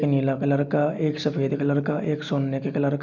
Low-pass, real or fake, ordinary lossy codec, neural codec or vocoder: 7.2 kHz; real; none; none